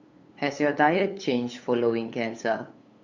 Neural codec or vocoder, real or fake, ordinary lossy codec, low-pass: codec, 16 kHz, 8 kbps, FunCodec, trained on LibriTTS, 25 frames a second; fake; Opus, 64 kbps; 7.2 kHz